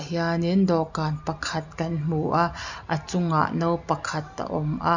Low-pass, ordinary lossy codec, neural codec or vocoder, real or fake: 7.2 kHz; none; none; real